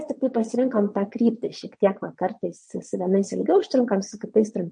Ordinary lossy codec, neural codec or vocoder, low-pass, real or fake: MP3, 48 kbps; vocoder, 22.05 kHz, 80 mel bands, WaveNeXt; 9.9 kHz; fake